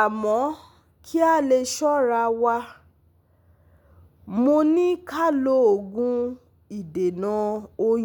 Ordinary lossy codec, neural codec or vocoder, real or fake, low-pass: none; none; real; 19.8 kHz